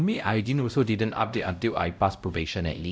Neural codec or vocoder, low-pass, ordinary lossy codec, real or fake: codec, 16 kHz, 0.5 kbps, X-Codec, WavLM features, trained on Multilingual LibriSpeech; none; none; fake